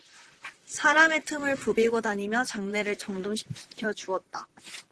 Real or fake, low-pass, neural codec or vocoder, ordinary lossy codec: fake; 10.8 kHz; vocoder, 44.1 kHz, 128 mel bands, Pupu-Vocoder; Opus, 16 kbps